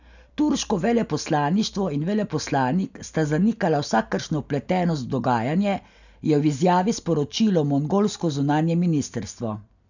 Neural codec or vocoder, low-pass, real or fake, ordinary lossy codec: none; 7.2 kHz; real; none